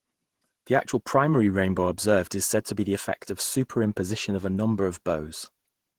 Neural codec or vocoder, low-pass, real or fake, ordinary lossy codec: vocoder, 48 kHz, 128 mel bands, Vocos; 19.8 kHz; fake; Opus, 16 kbps